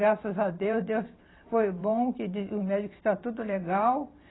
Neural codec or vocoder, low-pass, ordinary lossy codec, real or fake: vocoder, 44.1 kHz, 128 mel bands every 512 samples, BigVGAN v2; 7.2 kHz; AAC, 16 kbps; fake